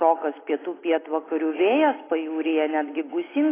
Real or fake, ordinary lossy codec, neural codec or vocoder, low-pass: real; AAC, 16 kbps; none; 3.6 kHz